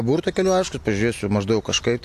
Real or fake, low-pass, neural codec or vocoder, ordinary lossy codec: real; 14.4 kHz; none; AAC, 64 kbps